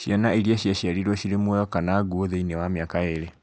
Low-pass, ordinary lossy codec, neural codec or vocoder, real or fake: none; none; none; real